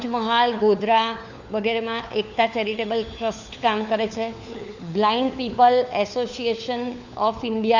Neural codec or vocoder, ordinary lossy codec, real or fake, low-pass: codec, 16 kHz, 4 kbps, FunCodec, trained on LibriTTS, 50 frames a second; none; fake; 7.2 kHz